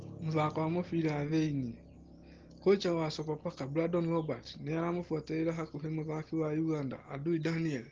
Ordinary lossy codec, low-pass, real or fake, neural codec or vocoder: Opus, 16 kbps; 7.2 kHz; real; none